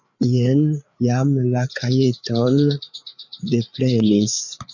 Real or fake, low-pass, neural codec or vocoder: fake; 7.2 kHz; codec, 16 kHz, 4 kbps, FreqCodec, larger model